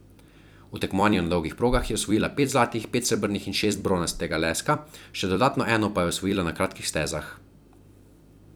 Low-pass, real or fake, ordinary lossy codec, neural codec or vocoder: none; fake; none; vocoder, 44.1 kHz, 128 mel bands every 256 samples, BigVGAN v2